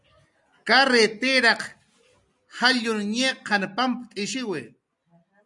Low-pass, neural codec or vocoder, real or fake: 10.8 kHz; none; real